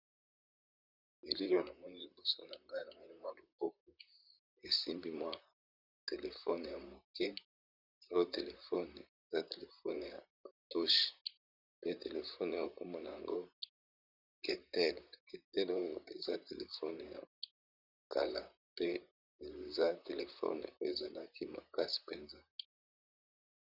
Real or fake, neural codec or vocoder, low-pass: fake; vocoder, 44.1 kHz, 128 mel bands, Pupu-Vocoder; 5.4 kHz